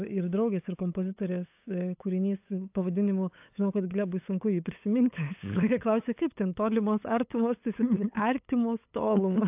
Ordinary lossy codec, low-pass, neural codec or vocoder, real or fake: AAC, 32 kbps; 3.6 kHz; codec, 16 kHz, 8 kbps, FunCodec, trained on LibriTTS, 25 frames a second; fake